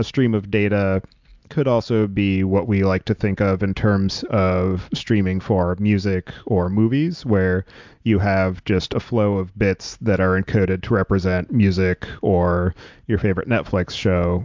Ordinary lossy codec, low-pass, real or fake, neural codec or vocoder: MP3, 64 kbps; 7.2 kHz; real; none